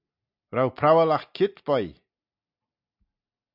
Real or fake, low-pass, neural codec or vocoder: real; 5.4 kHz; none